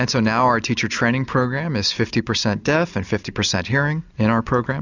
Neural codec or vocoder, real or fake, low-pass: none; real; 7.2 kHz